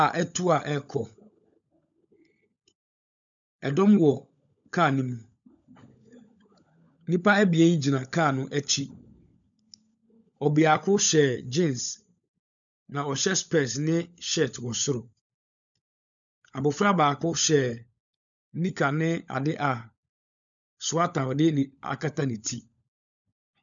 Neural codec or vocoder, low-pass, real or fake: codec, 16 kHz, 16 kbps, FunCodec, trained on LibriTTS, 50 frames a second; 7.2 kHz; fake